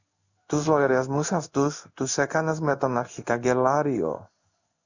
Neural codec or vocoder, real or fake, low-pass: codec, 16 kHz in and 24 kHz out, 1 kbps, XY-Tokenizer; fake; 7.2 kHz